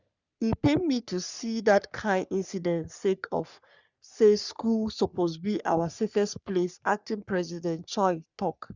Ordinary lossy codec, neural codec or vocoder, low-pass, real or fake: Opus, 64 kbps; codec, 44.1 kHz, 3.4 kbps, Pupu-Codec; 7.2 kHz; fake